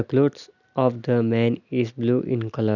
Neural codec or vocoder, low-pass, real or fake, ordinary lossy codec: none; 7.2 kHz; real; none